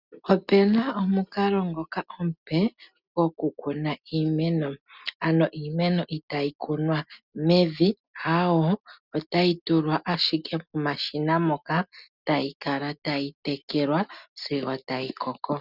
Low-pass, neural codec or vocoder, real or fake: 5.4 kHz; none; real